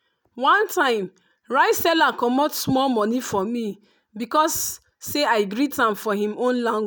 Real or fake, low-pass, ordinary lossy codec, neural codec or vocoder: real; none; none; none